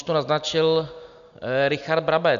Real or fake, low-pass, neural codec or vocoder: real; 7.2 kHz; none